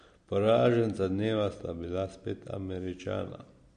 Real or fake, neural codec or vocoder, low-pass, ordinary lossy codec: real; none; 9.9 kHz; MP3, 48 kbps